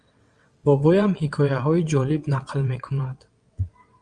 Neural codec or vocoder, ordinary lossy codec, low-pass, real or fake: vocoder, 22.05 kHz, 80 mel bands, WaveNeXt; Opus, 32 kbps; 9.9 kHz; fake